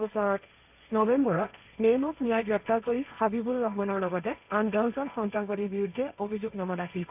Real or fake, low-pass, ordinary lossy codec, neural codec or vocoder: fake; 3.6 kHz; none; codec, 16 kHz, 1.1 kbps, Voila-Tokenizer